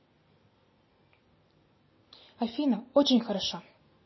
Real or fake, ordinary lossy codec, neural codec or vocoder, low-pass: real; MP3, 24 kbps; none; 7.2 kHz